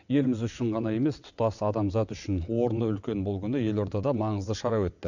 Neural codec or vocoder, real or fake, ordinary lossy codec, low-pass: vocoder, 22.05 kHz, 80 mel bands, WaveNeXt; fake; none; 7.2 kHz